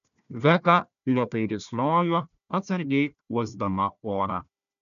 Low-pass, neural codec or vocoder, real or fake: 7.2 kHz; codec, 16 kHz, 1 kbps, FunCodec, trained on Chinese and English, 50 frames a second; fake